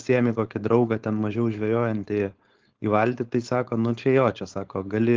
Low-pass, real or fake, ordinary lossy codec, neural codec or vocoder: 7.2 kHz; fake; Opus, 16 kbps; codec, 16 kHz, 4.8 kbps, FACodec